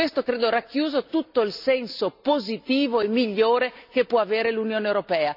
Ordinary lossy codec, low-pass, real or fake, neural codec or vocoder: none; 5.4 kHz; real; none